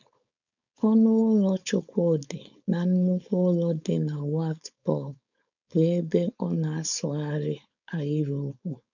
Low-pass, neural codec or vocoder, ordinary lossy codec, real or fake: 7.2 kHz; codec, 16 kHz, 4.8 kbps, FACodec; none; fake